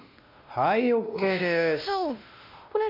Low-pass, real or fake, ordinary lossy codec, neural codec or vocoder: 5.4 kHz; fake; MP3, 48 kbps; codec, 16 kHz, 1 kbps, X-Codec, WavLM features, trained on Multilingual LibriSpeech